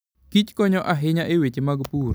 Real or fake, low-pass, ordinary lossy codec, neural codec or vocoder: real; none; none; none